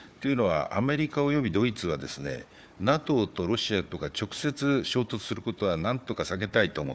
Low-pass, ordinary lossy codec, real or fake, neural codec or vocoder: none; none; fake; codec, 16 kHz, 4 kbps, FunCodec, trained on Chinese and English, 50 frames a second